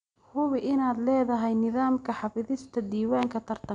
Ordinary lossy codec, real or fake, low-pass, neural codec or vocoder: none; real; 9.9 kHz; none